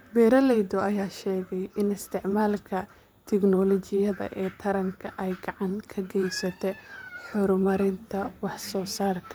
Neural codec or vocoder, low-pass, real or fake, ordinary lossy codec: vocoder, 44.1 kHz, 128 mel bands, Pupu-Vocoder; none; fake; none